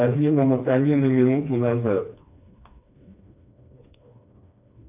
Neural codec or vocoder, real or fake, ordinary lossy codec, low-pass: codec, 16 kHz, 2 kbps, FreqCodec, smaller model; fake; AAC, 32 kbps; 3.6 kHz